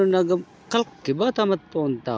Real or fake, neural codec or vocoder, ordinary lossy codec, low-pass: real; none; none; none